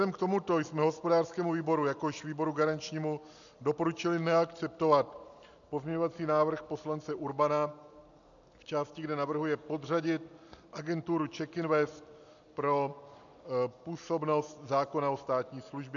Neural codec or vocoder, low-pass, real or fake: none; 7.2 kHz; real